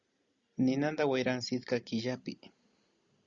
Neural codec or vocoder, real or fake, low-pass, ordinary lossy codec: none; real; 7.2 kHz; Opus, 64 kbps